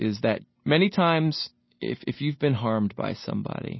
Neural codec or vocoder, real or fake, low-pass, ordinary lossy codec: none; real; 7.2 kHz; MP3, 24 kbps